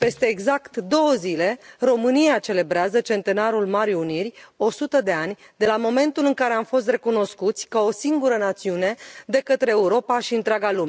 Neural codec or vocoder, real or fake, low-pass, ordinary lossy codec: none; real; none; none